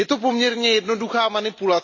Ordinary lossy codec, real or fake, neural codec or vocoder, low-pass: MP3, 32 kbps; real; none; 7.2 kHz